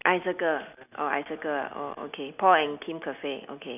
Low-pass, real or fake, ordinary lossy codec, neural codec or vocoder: 3.6 kHz; real; none; none